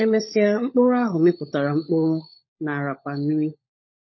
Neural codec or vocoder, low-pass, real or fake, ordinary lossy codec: codec, 16 kHz, 16 kbps, FunCodec, trained on LibriTTS, 50 frames a second; 7.2 kHz; fake; MP3, 24 kbps